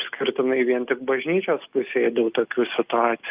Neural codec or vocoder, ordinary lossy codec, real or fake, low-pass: none; Opus, 32 kbps; real; 3.6 kHz